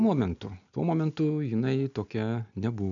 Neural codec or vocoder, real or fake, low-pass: none; real; 7.2 kHz